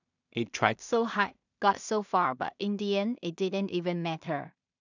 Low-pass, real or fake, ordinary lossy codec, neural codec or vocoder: 7.2 kHz; fake; none; codec, 16 kHz in and 24 kHz out, 0.4 kbps, LongCat-Audio-Codec, two codebook decoder